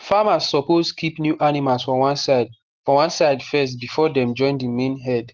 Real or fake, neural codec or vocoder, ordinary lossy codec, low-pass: real; none; Opus, 16 kbps; 7.2 kHz